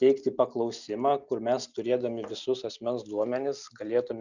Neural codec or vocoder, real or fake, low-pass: none; real; 7.2 kHz